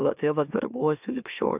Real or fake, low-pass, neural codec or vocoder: fake; 3.6 kHz; autoencoder, 44.1 kHz, a latent of 192 numbers a frame, MeloTTS